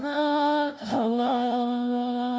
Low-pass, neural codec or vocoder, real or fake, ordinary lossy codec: none; codec, 16 kHz, 0.5 kbps, FunCodec, trained on LibriTTS, 25 frames a second; fake; none